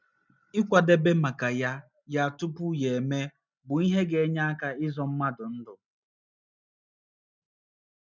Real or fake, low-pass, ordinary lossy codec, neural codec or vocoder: real; 7.2 kHz; none; none